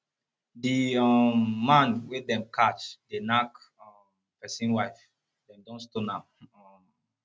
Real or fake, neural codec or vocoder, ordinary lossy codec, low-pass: real; none; none; none